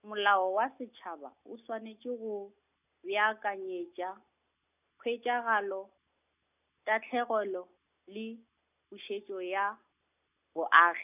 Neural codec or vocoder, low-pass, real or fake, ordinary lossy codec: none; 3.6 kHz; real; none